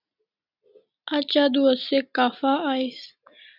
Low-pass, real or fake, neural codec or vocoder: 5.4 kHz; real; none